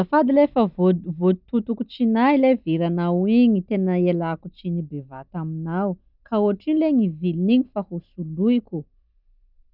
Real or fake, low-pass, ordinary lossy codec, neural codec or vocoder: real; 5.4 kHz; none; none